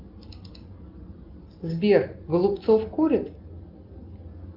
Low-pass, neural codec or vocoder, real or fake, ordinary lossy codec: 5.4 kHz; none; real; Opus, 24 kbps